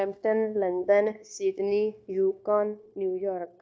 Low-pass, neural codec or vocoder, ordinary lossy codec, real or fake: none; codec, 16 kHz, 0.9 kbps, LongCat-Audio-Codec; none; fake